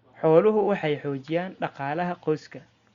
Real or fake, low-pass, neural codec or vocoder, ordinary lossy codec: real; 7.2 kHz; none; Opus, 64 kbps